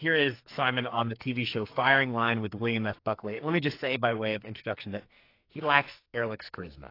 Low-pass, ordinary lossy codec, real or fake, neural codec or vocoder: 5.4 kHz; AAC, 32 kbps; fake; codec, 44.1 kHz, 2.6 kbps, SNAC